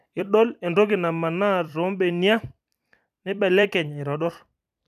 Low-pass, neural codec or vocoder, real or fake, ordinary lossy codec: 14.4 kHz; vocoder, 44.1 kHz, 128 mel bands every 256 samples, BigVGAN v2; fake; none